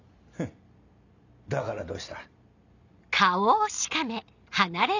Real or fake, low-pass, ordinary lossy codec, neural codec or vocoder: real; 7.2 kHz; none; none